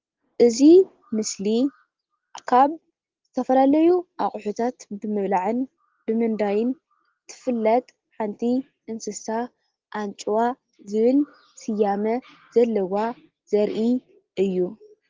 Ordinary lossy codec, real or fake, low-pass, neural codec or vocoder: Opus, 16 kbps; real; 7.2 kHz; none